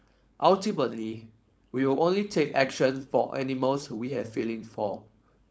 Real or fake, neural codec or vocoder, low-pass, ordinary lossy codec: fake; codec, 16 kHz, 4.8 kbps, FACodec; none; none